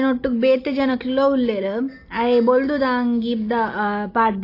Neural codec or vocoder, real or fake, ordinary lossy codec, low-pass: none; real; AAC, 32 kbps; 5.4 kHz